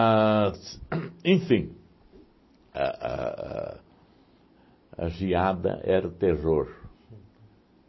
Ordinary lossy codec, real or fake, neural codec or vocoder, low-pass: MP3, 24 kbps; real; none; 7.2 kHz